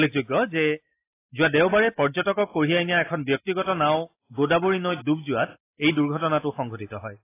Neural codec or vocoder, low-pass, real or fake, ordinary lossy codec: none; 3.6 kHz; real; AAC, 24 kbps